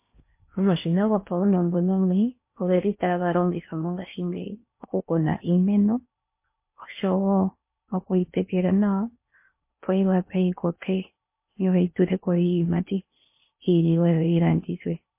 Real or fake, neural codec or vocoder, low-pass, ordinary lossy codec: fake; codec, 16 kHz in and 24 kHz out, 0.8 kbps, FocalCodec, streaming, 65536 codes; 3.6 kHz; MP3, 24 kbps